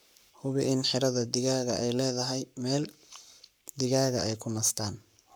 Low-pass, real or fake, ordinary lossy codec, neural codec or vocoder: none; fake; none; codec, 44.1 kHz, 7.8 kbps, Pupu-Codec